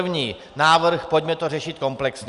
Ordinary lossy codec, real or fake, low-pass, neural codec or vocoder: Opus, 64 kbps; real; 10.8 kHz; none